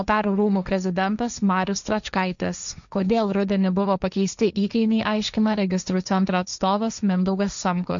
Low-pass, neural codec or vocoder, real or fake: 7.2 kHz; codec, 16 kHz, 1.1 kbps, Voila-Tokenizer; fake